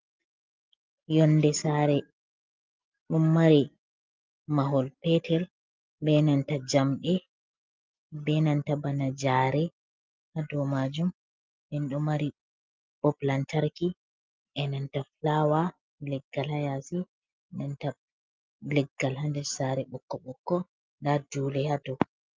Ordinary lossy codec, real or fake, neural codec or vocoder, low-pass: Opus, 24 kbps; real; none; 7.2 kHz